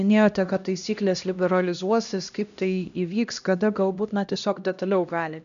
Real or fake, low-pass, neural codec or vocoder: fake; 7.2 kHz; codec, 16 kHz, 1 kbps, X-Codec, HuBERT features, trained on LibriSpeech